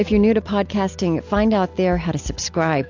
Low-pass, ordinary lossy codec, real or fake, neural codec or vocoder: 7.2 kHz; AAC, 48 kbps; real; none